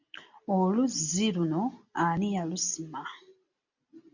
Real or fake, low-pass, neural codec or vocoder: real; 7.2 kHz; none